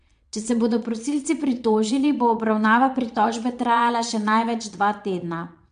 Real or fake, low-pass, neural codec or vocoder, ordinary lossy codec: fake; 9.9 kHz; vocoder, 22.05 kHz, 80 mel bands, Vocos; MP3, 64 kbps